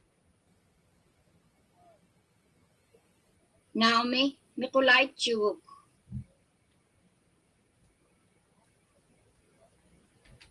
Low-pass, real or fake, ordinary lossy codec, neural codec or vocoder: 10.8 kHz; real; Opus, 24 kbps; none